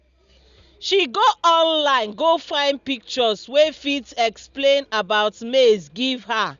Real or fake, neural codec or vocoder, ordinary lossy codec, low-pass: real; none; Opus, 64 kbps; 7.2 kHz